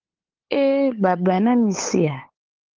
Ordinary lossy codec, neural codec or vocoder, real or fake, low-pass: Opus, 16 kbps; codec, 16 kHz, 8 kbps, FunCodec, trained on LibriTTS, 25 frames a second; fake; 7.2 kHz